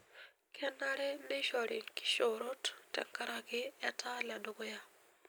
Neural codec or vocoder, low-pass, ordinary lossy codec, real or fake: none; none; none; real